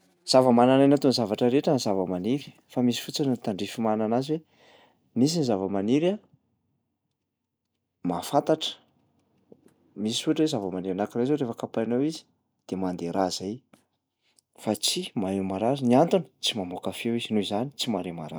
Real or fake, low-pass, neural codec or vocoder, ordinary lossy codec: real; none; none; none